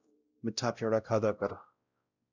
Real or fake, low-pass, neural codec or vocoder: fake; 7.2 kHz; codec, 16 kHz, 0.5 kbps, X-Codec, WavLM features, trained on Multilingual LibriSpeech